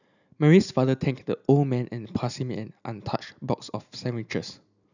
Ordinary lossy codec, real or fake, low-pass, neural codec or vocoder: none; real; 7.2 kHz; none